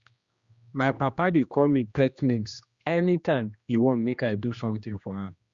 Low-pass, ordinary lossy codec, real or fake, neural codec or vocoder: 7.2 kHz; Opus, 64 kbps; fake; codec, 16 kHz, 1 kbps, X-Codec, HuBERT features, trained on general audio